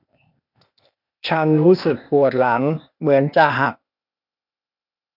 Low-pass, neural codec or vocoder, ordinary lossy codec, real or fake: 5.4 kHz; codec, 16 kHz, 0.8 kbps, ZipCodec; none; fake